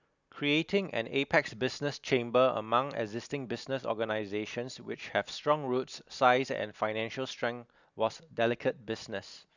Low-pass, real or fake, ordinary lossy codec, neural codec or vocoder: 7.2 kHz; real; none; none